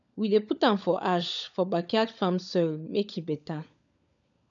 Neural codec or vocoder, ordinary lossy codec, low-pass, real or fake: codec, 16 kHz, 16 kbps, FunCodec, trained on LibriTTS, 50 frames a second; none; 7.2 kHz; fake